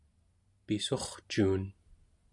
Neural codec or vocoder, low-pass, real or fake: none; 10.8 kHz; real